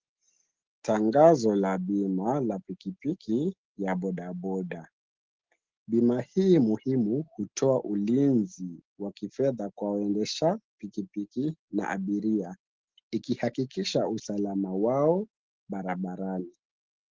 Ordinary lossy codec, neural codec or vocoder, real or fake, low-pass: Opus, 16 kbps; none; real; 7.2 kHz